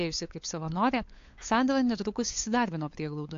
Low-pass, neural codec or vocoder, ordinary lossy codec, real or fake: 7.2 kHz; codec, 16 kHz, 8 kbps, FunCodec, trained on LibriTTS, 25 frames a second; AAC, 48 kbps; fake